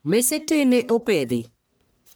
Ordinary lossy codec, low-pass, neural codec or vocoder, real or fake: none; none; codec, 44.1 kHz, 1.7 kbps, Pupu-Codec; fake